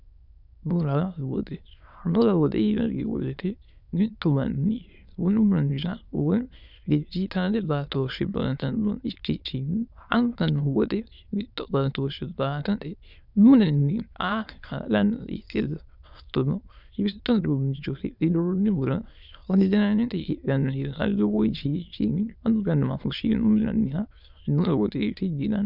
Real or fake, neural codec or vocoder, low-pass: fake; autoencoder, 22.05 kHz, a latent of 192 numbers a frame, VITS, trained on many speakers; 5.4 kHz